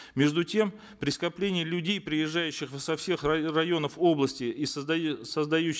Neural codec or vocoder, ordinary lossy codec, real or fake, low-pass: none; none; real; none